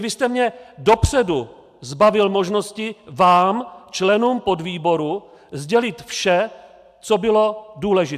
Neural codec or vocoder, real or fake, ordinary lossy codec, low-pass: none; real; AAC, 96 kbps; 14.4 kHz